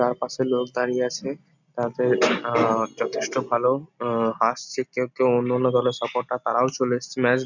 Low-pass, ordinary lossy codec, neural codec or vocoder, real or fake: 7.2 kHz; none; none; real